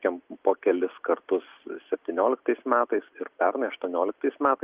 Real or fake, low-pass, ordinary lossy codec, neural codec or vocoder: real; 3.6 kHz; Opus, 32 kbps; none